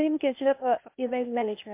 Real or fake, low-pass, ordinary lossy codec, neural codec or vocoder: fake; 3.6 kHz; MP3, 32 kbps; codec, 16 kHz, 0.8 kbps, ZipCodec